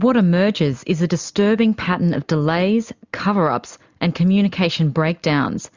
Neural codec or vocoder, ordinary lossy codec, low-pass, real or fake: none; Opus, 64 kbps; 7.2 kHz; real